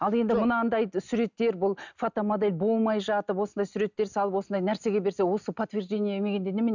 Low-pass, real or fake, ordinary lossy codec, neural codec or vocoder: 7.2 kHz; real; none; none